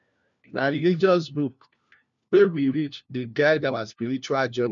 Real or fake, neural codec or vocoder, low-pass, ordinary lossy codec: fake; codec, 16 kHz, 1 kbps, FunCodec, trained on LibriTTS, 50 frames a second; 7.2 kHz; MP3, 64 kbps